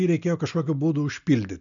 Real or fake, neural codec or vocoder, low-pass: real; none; 7.2 kHz